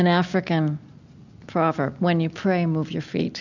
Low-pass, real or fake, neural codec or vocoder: 7.2 kHz; real; none